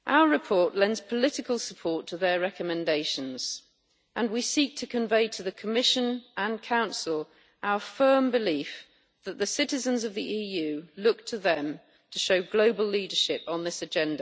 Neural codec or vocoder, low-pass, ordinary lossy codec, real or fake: none; none; none; real